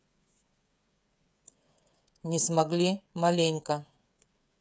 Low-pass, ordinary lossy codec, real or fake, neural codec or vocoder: none; none; fake; codec, 16 kHz, 16 kbps, FreqCodec, smaller model